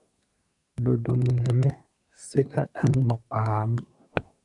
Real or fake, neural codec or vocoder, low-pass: fake; codec, 24 kHz, 1 kbps, SNAC; 10.8 kHz